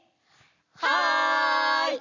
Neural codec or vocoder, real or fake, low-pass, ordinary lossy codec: none; real; 7.2 kHz; none